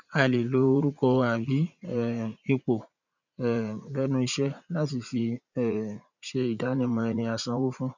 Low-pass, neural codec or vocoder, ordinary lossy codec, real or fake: 7.2 kHz; vocoder, 44.1 kHz, 80 mel bands, Vocos; none; fake